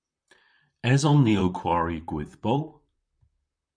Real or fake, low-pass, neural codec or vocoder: fake; 9.9 kHz; vocoder, 44.1 kHz, 128 mel bands, Pupu-Vocoder